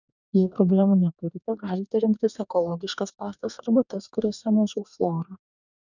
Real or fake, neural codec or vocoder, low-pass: fake; codec, 44.1 kHz, 2.6 kbps, DAC; 7.2 kHz